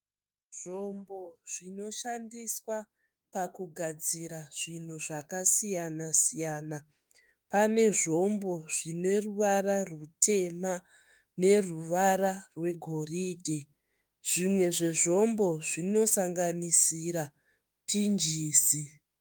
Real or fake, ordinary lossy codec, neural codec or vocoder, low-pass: fake; Opus, 32 kbps; autoencoder, 48 kHz, 32 numbers a frame, DAC-VAE, trained on Japanese speech; 19.8 kHz